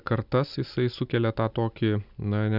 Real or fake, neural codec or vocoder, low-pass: real; none; 5.4 kHz